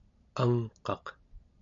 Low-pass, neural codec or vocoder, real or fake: 7.2 kHz; none; real